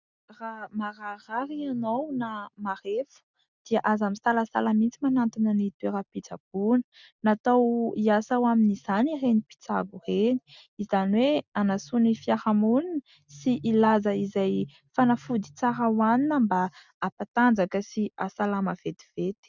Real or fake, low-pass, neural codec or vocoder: real; 7.2 kHz; none